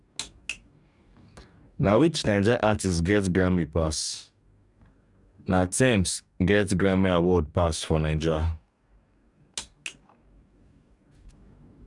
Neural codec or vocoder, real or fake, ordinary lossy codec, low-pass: codec, 44.1 kHz, 2.6 kbps, DAC; fake; none; 10.8 kHz